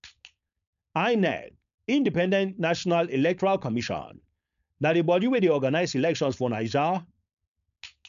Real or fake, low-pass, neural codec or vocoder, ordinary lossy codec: fake; 7.2 kHz; codec, 16 kHz, 4.8 kbps, FACodec; MP3, 96 kbps